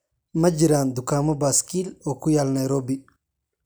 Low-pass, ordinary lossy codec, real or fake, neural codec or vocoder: none; none; real; none